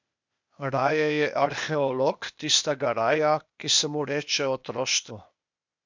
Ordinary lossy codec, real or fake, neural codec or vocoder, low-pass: MP3, 64 kbps; fake; codec, 16 kHz, 0.8 kbps, ZipCodec; 7.2 kHz